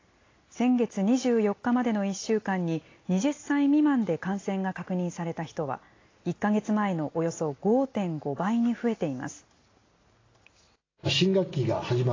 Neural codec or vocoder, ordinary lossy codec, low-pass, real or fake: none; AAC, 32 kbps; 7.2 kHz; real